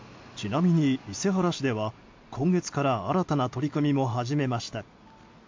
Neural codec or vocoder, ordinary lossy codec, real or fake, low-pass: none; MP3, 48 kbps; real; 7.2 kHz